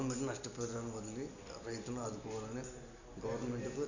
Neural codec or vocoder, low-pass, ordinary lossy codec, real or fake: none; 7.2 kHz; none; real